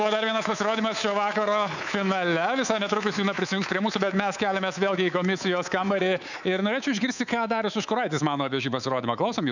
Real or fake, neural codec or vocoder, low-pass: fake; codec, 24 kHz, 3.1 kbps, DualCodec; 7.2 kHz